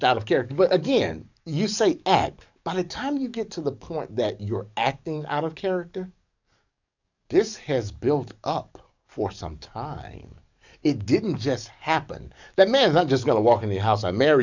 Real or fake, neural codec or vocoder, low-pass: fake; codec, 44.1 kHz, 7.8 kbps, DAC; 7.2 kHz